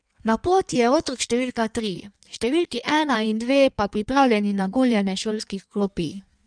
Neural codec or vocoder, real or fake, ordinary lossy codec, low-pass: codec, 16 kHz in and 24 kHz out, 1.1 kbps, FireRedTTS-2 codec; fake; none; 9.9 kHz